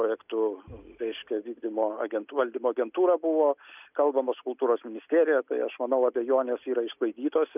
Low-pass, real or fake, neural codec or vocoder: 3.6 kHz; real; none